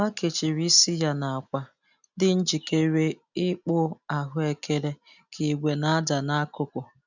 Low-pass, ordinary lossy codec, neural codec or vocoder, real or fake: 7.2 kHz; none; none; real